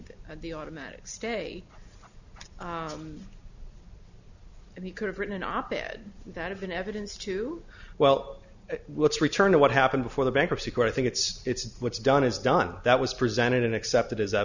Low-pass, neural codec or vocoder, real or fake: 7.2 kHz; none; real